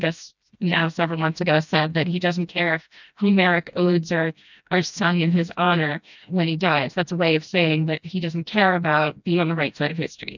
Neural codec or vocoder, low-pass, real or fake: codec, 16 kHz, 1 kbps, FreqCodec, smaller model; 7.2 kHz; fake